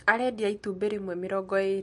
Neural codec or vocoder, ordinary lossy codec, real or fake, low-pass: none; MP3, 48 kbps; real; 14.4 kHz